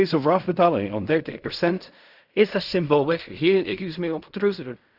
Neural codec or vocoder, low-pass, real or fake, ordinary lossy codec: codec, 16 kHz in and 24 kHz out, 0.4 kbps, LongCat-Audio-Codec, fine tuned four codebook decoder; 5.4 kHz; fake; none